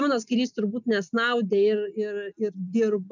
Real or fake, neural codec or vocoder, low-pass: real; none; 7.2 kHz